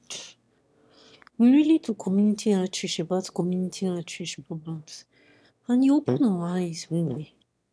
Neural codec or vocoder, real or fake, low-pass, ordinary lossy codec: autoencoder, 22.05 kHz, a latent of 192 numbers a frame, VITS, trained on one speaker; fake; none; none